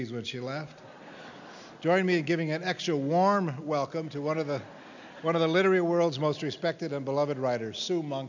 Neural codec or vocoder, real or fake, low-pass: none; real; 7.2 kHz